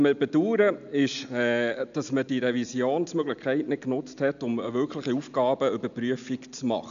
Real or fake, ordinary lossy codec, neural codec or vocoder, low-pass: real; none; none; 7.2 kHz